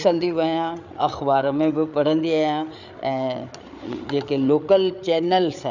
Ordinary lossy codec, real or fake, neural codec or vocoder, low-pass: none; fake; codec, 16 kHz, 8 kbps, FreqCodec, larger model; 7.2 kHz